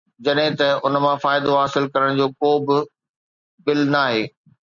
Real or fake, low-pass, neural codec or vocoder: real; 7.2 kHz; none